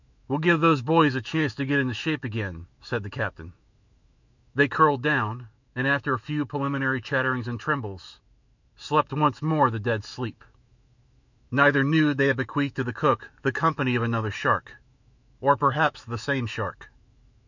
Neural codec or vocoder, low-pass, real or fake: autoencoder, 48 kHz, 128 numbers a frame, DAC-VAE, trained on Japanese speech; 7.2 kHz; fake